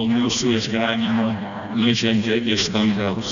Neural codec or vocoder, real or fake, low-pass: codec, 16 kHz, 1 kbps, FreqCodec, smaller model; fake; 7.2 kHz